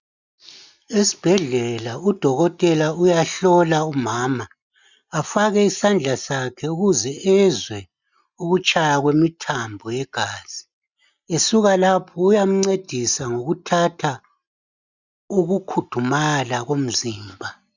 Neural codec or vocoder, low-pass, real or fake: none; 7.2 kHz; real